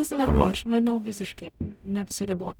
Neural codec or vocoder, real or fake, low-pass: codec, 44.1 kHz, 0.9 kbps, DAC; fake; 19.8 kHz